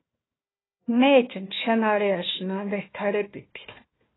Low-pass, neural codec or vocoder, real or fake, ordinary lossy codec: 7.2 kHz; codec, 16 kHz, 1 kbps, FunCodec, trained on Chinese and English, 50 frames a second; fake; AAC, 16 kbps